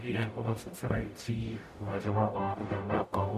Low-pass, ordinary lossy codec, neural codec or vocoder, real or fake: 14.4 kHz; MP3, 96 kbps; codec, 44.1 kHz, 0.9 kbps, DAC; fake